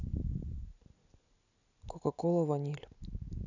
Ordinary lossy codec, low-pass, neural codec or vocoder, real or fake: none; 7.2 kHz; none; real